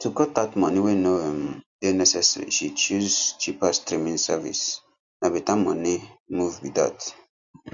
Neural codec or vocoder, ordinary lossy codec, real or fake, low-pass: none; MP3, 64 kbps; real; 7.2 kHz